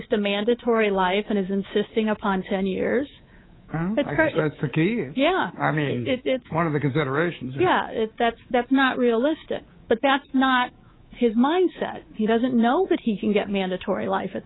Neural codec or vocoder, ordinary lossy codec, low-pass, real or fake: codec, 24 kHz, 3.1 kbps, DualCodec; AAC, 16 kbps; 7.2 kHz; fake